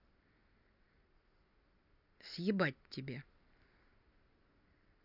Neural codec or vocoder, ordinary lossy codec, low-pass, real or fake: none; none; 5.4 kHz; real